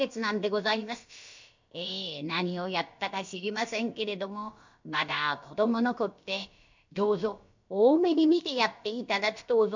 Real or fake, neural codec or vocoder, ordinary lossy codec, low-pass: fake; codec, 16 kHz, about 1 kbps, DyCAST, with the encoder's durations; MP3, 64 kbps; 7.2 kHz